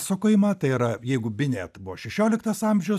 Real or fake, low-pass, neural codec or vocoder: real; 14.4 kHz; none